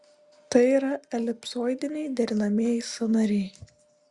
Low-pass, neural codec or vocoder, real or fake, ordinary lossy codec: 10.8 kHz; none; real; Opus, 64 kbps